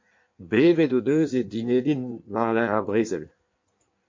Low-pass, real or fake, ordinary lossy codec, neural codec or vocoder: 7.2 kHz; fake; MP3, 48 kbps; codec, 16 kHz in and 24 kHz out, 1.1 kbps, FireRedTTS-2 codec